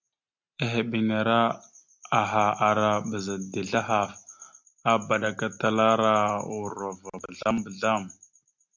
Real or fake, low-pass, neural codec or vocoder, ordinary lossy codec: real; 7.2 kHz; none; MP3, 64 kbps